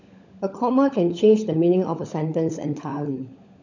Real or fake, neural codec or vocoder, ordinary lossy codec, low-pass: fake; codec, 16 kHz, 16 kbps, FunCodec, trained on LibriTTS, 50 frames a second; none; 7.2 kHz